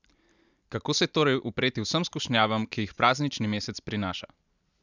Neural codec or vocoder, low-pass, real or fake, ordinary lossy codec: none; 7.2 kHz; real; none